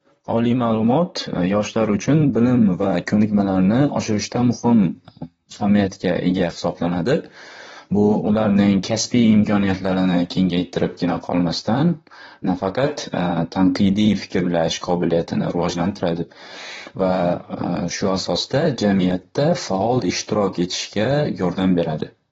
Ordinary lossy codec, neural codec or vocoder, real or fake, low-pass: AAC, 24 kbps; vocoder, 44.1 kHz, 128 mel bands, Pupu-Vocoder; fake; 19.8 kHz